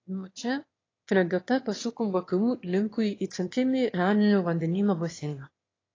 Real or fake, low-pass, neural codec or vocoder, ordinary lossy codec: fake; 7.2 kHz; autoencoder, 22.05 kHz, a latent of 192 numbers a frame, VITS, trained on one speaker; AAC, 32 kbps